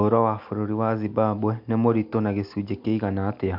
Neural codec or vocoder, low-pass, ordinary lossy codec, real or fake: none; 5.4 kHz; MP3, 48 kbps; real